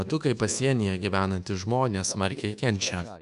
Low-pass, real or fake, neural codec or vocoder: 10.8 kHz; fake; codec, 24 kHz, 1.2 kbps, DualCodec